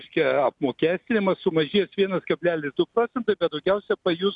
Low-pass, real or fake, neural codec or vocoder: 10.8 kHz; real; none